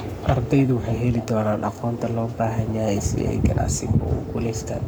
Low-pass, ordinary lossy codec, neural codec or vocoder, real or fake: none; none; codec, 44.1 kHz, 7.8 kbps, Pupu-Codec; fake